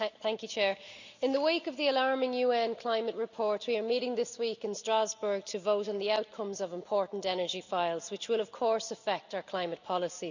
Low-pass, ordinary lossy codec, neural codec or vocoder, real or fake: 7.2 kHz; none; none; real